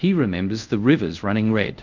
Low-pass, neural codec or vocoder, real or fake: 7.2 kHz; codec, 24 kHz, 0.5 kbps, DualCodec; fake